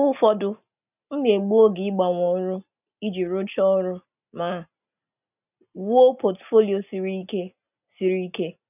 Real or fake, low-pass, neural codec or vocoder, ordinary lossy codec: real; 3.6 kHz; none; none